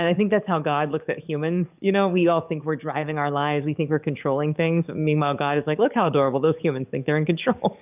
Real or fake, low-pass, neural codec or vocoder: fake; 3.6 kHz; codec, 44.1 kHz, 7.8 kbps, DAC